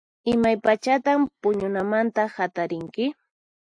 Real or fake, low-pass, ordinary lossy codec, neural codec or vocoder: real; 9.9 kHz; AAC, 64 kbps; none